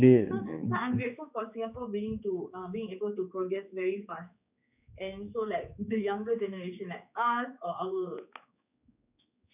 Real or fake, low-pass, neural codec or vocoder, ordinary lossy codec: fake; 3.6 kHz; codec, 16 kHz, 4 kbps, X-Codec, HuBERT features, trained on general audio; none